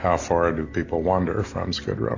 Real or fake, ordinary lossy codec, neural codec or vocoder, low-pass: real; AAC, 32 kbps; none; 7.2 kHz